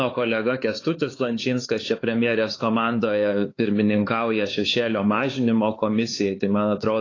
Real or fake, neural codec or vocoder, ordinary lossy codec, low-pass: fake; codec, 16 kHz, 4 kbps, X-Codec, HuBERT features, trained on LibriSpeech; AAC, 32 kbps; 7.2 kHz